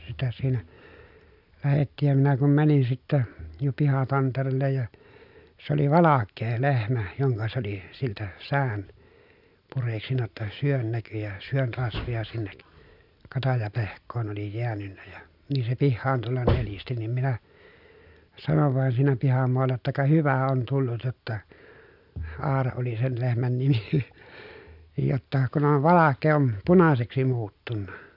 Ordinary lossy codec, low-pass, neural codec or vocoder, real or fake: none; 5.4 kHz; none; real